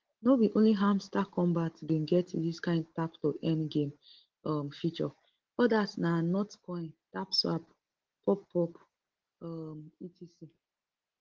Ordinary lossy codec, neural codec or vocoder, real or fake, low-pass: Opus, 16 kbps; none; real; 7.2 kHz